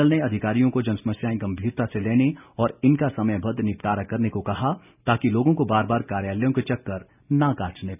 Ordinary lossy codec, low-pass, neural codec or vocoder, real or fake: none; 3.6 kHz; none; real